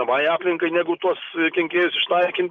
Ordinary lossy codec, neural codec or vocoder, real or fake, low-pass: Opus, 32 kbps; none; real; 7.2 kHz